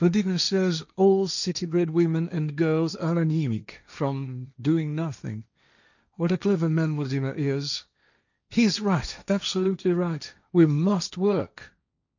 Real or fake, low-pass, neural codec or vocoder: fake; 7.2 kHz; codec, 16 kHz, 1.1 kbps, Voila-Tokenizer